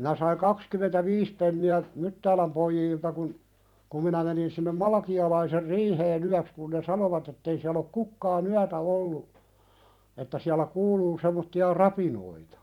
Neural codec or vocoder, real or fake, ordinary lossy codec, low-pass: vocoder, 44.1 kHz, 128 mel bands every 512 samples, BigVGAN v2; fake; none; 19.8 kHz